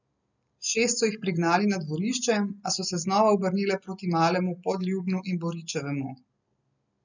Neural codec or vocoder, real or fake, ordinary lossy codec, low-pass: none; real; none; 7.2 kHz